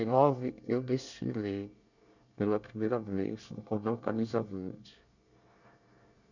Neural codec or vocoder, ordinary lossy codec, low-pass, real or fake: codec, 24 kHz, 1 kbps, SNAC; none; 7.2 kHz; fake